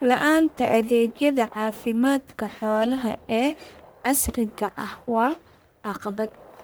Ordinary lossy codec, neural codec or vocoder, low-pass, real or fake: none; codec, 44.1 kHz, 1.7 kbps, Pupu-Codec; none; fake